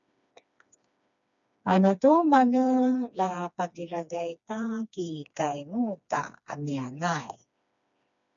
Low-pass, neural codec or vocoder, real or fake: 7.2 kHz; codec, 16 kHz, 2 kbps, FreqCodec, smaller model; fake